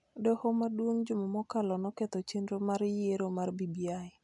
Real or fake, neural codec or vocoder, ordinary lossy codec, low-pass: real; none; MP3, 96 kbps; 10.8 kHz